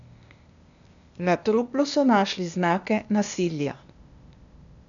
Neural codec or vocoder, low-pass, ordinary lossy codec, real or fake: codec, 16 kHz, 0.8 kbps, ZipCodec; 7.2 kHz; none; fake